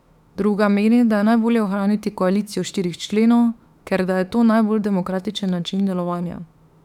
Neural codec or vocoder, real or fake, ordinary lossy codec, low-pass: autoencoder, 48 kHz, 32 numbers a frame, DAC-VAE, trained on Japanese speech; fake; none; 19.8 kHz